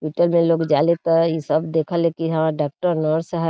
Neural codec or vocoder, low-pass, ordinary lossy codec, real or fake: none; none; none; real